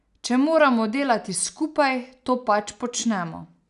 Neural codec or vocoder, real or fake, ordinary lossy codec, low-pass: none; real; none; 10.8 kHz